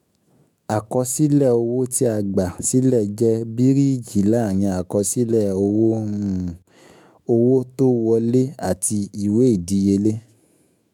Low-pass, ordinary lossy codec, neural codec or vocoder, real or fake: 19.8 kHz; MP3, 96 kbps; autoencoder, 48 kHz, 128 numbers a frame, DAC-VAE, trained on Japanese speech; fake